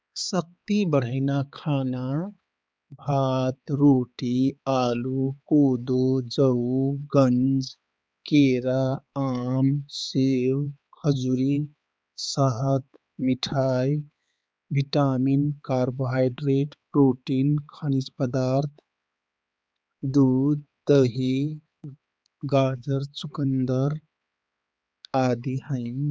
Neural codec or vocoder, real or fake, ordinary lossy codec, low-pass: codec, 16 kHz, 4 kbps, X-Codec, HuBERT features, trained on balanced general audio; fake; none; none